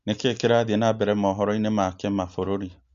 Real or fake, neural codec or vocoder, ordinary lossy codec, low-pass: real; none; Opus, 64 kbps; 7.2 kHz